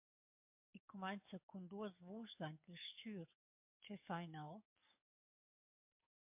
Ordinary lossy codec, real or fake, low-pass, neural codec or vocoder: MP3, 32 kbps; fake; 3.6 kHz; codec, 44.1 kHz, 7.8 kbps, DAC